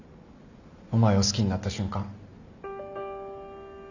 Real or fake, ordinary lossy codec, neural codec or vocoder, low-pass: real; none; none; 7.2 kHz